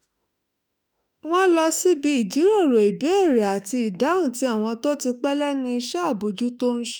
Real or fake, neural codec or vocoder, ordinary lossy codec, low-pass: fake; autoencoder, 48 kHz, 32 numbers a frame, DAC-VAE, trained on Japanese speech; none; none